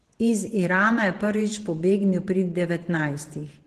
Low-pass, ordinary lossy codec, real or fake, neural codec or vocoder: 14.4 kHz; Opus, 16 kbps; fake; vocoder, 48 kHz, 128 mel bands, Vocos